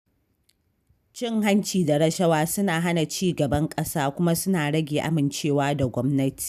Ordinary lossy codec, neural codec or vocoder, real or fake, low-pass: none; none; real; 14.4 kHz